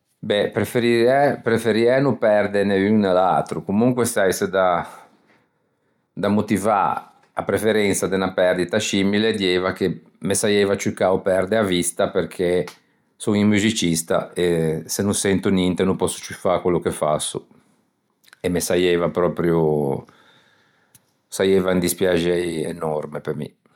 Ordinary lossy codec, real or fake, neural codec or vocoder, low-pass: none; real; none; 19.8 kHz